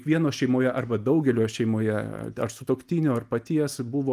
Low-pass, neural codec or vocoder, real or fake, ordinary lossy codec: 14.4 kHz; none; real; Opus, 32 kbps